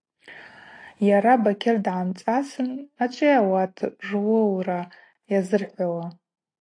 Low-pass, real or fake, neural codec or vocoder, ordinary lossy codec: 9.9 kHz; real; none; AAC, 48 kbps